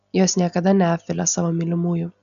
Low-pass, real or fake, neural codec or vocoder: 7.2 kHz; real; none